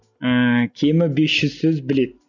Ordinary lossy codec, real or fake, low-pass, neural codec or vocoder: none; real; 7.2 kHz; none